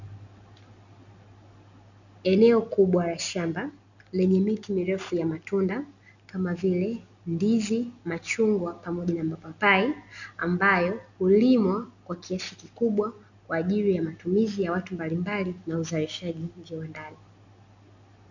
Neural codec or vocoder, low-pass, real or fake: none; 7.2 kHz; real